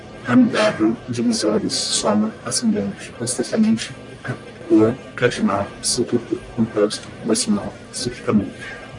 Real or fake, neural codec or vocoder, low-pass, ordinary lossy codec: fake; codec, 44.1 kHz, 1.7 kbps, Pupu-Codec; 10.8 kHz; MP3, 96 kbps